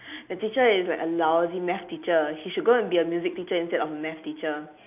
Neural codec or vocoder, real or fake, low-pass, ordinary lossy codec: none; real; 3.6 kHz; none